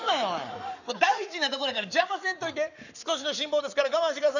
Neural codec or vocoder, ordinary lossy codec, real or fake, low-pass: codec, 44.1 kHz, 7.8 kbps, Pupu-Codec; none; fake; 7.2 kHz